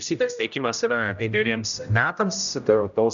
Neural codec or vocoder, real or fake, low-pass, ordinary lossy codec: codec, 16 kHz, 0.5 kbps, X-Codec, HuBERT features, trained on general audio; fake; 7.2 kHz; MP3, 96 kbps